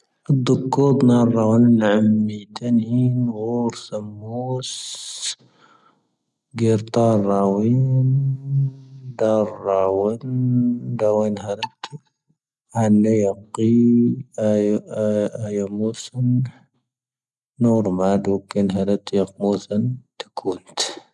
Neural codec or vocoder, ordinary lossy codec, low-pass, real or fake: none; none; none; real